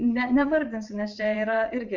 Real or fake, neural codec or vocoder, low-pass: fake; vocoder, 22.05 kHz, 80 mel bands, WaveNeXt; 7.2 kHz